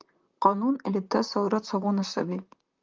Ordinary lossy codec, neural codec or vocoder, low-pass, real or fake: Opus, 24 kbps; vocoder, 44.1 kHz, 128 mel bands, Pupu-Vocoder; 7.2 kHz; fake